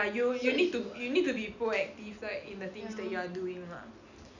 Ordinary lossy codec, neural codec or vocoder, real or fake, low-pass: none; none; real; 7.2 kHz